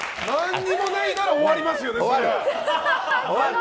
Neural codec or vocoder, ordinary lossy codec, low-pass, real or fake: none; none; none; real